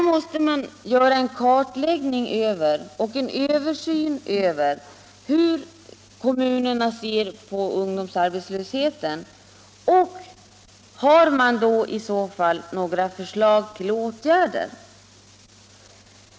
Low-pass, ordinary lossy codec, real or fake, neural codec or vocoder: none; none; real; none